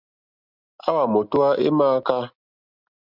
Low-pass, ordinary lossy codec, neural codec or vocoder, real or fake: 5.4 kHz; Opus, 64 kbps; none; real